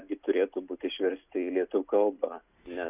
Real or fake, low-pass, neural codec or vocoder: real; 3.6 kHz; none